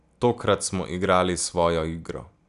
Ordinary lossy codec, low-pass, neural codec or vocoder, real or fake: none; 10.8 kHz; none; real